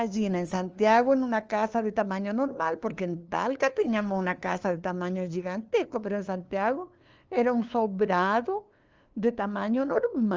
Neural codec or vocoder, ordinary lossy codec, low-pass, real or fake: codec, 16 kHz, 2 kbps, FunCodec, trained on LibriTTS, 25 frames a second; Opus, 24 kbps; 7.2 kHz; fake